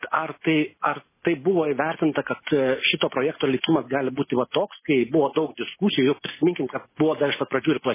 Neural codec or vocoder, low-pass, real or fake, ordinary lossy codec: none; 3.6 kHz; real; MP3, 16 kbps